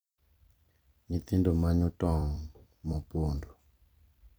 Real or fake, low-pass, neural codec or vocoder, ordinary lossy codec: fake; none; vocoder, 44.1 kHz, 128 mel bands every 256 samples, BigVGAN v2; none